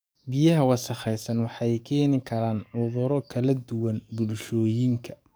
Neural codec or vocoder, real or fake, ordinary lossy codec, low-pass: codec, 44.1 kHz, 7.8 kbps, DAC; fake; none; none